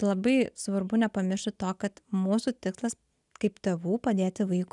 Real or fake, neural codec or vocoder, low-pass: real; none; 10.8 kHz